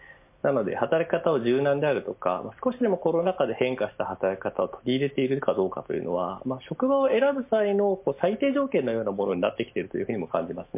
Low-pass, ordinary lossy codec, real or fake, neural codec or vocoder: 3.6 kHz; MP3, 24 kbps; real; none